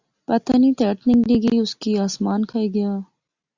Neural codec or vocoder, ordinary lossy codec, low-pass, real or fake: none; Opus, 64 kbps; 7.2 kHz; real